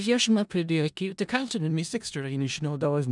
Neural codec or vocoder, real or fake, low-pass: codec, 16 kHz in and 24 kHz out, 0.4 kbps, LongCat-Audio-Codec, four codebook decoder; fake; 10.8 kHz